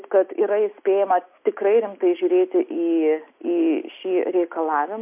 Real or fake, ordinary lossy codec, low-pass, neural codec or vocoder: real; MP3, 32 kbps; 3.6 kHz; none